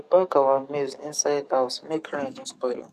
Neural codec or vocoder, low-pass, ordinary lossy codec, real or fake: codec, 44.1 kHz, 7.8 kbps, DAC; 14.4 kHz; none; fake